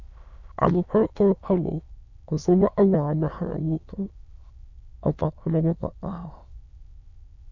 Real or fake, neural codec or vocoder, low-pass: fake; autoencoder, 22.05 kHz, a latent of 192 numbers a frame, VITS, trained on many speakers; 7.2 kHz